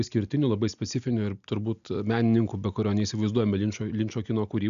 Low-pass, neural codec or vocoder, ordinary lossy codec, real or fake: 7.2 kHz; none; MP3, 96 kbps; real